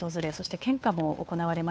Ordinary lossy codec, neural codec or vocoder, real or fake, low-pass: none; codec, 16 kHz, 2 kbps, FunCodec, trained on Chinese and English, 25 frames a second; fake; none